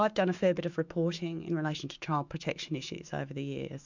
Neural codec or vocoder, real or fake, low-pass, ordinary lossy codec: autoencoder, 48 kHz, 128 numbers a frame, DAC-VAE, trained on Japanese speech; fake; 7.2 kHz; MP3, 64 kbps